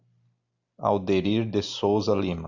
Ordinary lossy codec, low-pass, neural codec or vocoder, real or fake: Opus, 64 kbps; 7.2 kHz; none; real